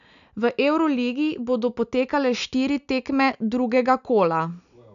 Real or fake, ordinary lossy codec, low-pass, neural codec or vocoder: real; none; 7.2 kHz; none